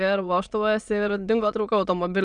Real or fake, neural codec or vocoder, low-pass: fake; autoencoder, 22.05 kHz, a latent of 192 numbers a frame, VITS, trained on many speakers; 9.9 kHz